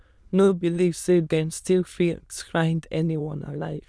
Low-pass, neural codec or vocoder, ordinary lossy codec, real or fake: none; autoencoder, 22.05 kHz, a latent of 192 numbers a frame, VITS, trained on many speakers; none; fake